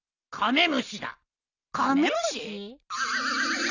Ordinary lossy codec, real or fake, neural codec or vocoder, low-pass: none; real; none; 7.2 kHz